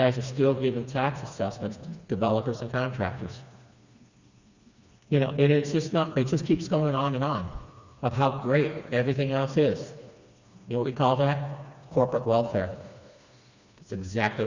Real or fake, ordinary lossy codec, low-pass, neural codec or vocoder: fake; Opus, 64 kbps; 7.2 kHz; codec, 16 kHz, 2 kbps, FreqCodec, smaller model